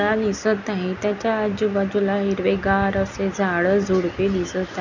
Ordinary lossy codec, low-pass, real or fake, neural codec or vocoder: none; 7.2 kHz; real; none